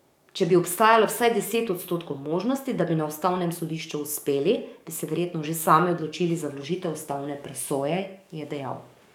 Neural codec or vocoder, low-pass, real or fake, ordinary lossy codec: codec, 44.1 kHz, 7.8 kbps, DAC; 19.8 kHz; fake; none